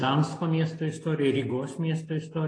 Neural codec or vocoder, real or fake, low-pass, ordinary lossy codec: none; real; 9.9 kHz; AAC, 32 kbps